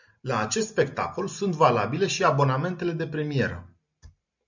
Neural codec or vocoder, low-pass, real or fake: none; 7.2 kHz; real